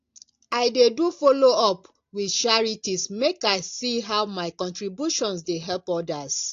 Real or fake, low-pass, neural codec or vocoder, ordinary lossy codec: real; 7.2 kHz; none; AAC, 48 kbps